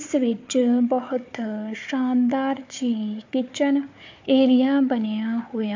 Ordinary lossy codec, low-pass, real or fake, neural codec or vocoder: MP3, 48 kbps; 7.2 kHz; fake; codec, 16 kHz, 4 kbps, FunCodec, trained on Chinese and English, 50 frames a second